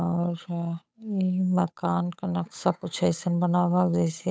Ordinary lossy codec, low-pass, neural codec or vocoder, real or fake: none; none; codec, 16 kHz, 8 kbps, FunCodec, trained on Chinese and English, 25 frames a second; fake